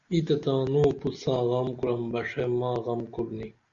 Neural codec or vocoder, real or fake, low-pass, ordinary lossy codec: none; real; 7.2 kHz; Opus, 64 kbps